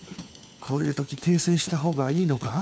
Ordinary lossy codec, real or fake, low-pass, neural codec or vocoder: none; fake; none; codec, 16 kHz, 4 kbps, FunCodec, trained on LibriTTS, 50 frames a second